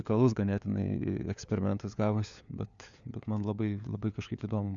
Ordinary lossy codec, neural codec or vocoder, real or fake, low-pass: Opus, 64 kbps; codec, 16 kHz, 4 kbps, FunCodec, trained on LibriTTS, 50 frames a second; fake; 7.2 kHz